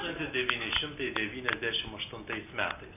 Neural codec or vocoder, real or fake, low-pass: none; real; 3.6 kHz